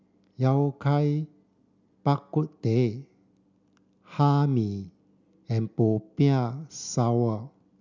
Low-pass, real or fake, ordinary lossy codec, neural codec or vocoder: 7.2 kHz; real; none; none